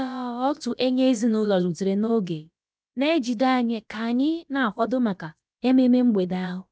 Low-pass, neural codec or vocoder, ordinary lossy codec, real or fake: none; codec, 16 kHz, about 1 kbps, DyCAST, with the encoder's durations; none; fake